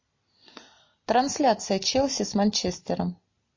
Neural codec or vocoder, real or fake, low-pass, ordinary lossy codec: none; real; 7.2 kHz; MP3, 32 kbps